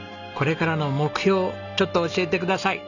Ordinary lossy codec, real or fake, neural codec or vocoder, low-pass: none; real; none; 7.2 kHz